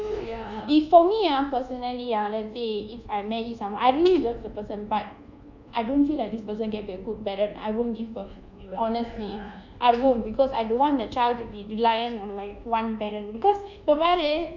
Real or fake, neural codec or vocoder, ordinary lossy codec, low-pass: fake; codec, 24 kHz, 1.2 kbps, DualCodec; none; 7.2 kHz